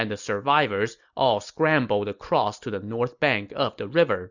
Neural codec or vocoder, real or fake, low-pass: none; real; 7.2 kHz